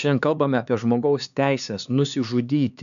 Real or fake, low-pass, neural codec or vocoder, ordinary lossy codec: fake; 7.2 kHz; codec, 16 kHz, 4 kbps, X-Codec, HuBERT features, trained on LibriSpeech; MP3, 96 kbps